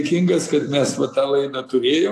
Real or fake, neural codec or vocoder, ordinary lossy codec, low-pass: real; none; AAC, 64 kbps; 14.4 kHz